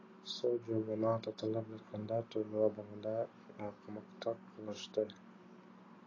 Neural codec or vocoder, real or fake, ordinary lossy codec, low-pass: none; real; AAC, 32 kbps; 7.2 kHz